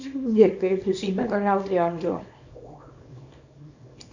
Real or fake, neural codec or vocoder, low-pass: fake; codec, 24 kHz, 0.9 kbps, WavTokenizer, small release; 7.2 kHz